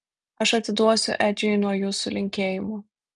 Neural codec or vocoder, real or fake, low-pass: none; real; 10.8 kHz